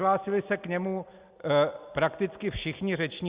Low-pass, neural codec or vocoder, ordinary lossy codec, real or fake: 3.6 kHz; none; Opus, 64 kbps; real